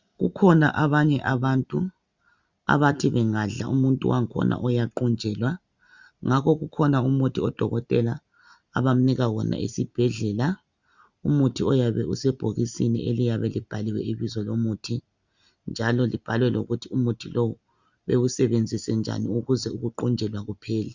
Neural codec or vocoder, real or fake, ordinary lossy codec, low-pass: none; real; Opus, 64 kbps; 7.2 kHz